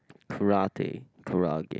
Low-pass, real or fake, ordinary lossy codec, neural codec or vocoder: none; real; none; none